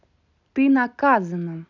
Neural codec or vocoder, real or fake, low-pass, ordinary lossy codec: none; real; 7.2 kHz; none